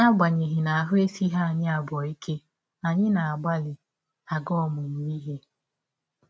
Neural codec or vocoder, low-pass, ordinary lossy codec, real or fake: none; none; none; real